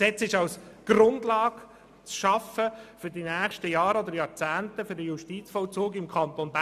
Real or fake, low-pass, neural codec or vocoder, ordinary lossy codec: real; 14.4 kHz; none; none